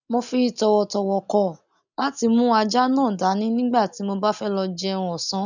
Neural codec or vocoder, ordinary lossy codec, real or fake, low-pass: none; none; real; 7.2 kHz